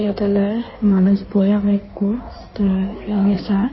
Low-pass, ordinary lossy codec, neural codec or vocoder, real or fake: 7.2 kHz; MP3, 24 kbps; codec, 16 kHz in and 24 kHz out, 1.1 kbps, FireRedTTS-2 codec; fake